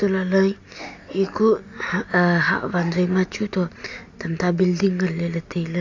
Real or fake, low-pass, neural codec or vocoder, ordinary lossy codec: real; 7.2 kHz; none; AAC, 48 kbps